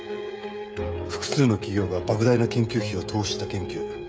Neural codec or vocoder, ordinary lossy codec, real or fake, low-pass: codec, 16 kHz, 16 kbps, FreqCodec, smaller model; none; fake; none